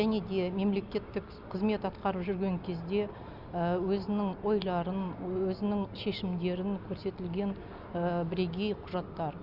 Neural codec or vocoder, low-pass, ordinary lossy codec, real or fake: none; 5.4 kHz; none; real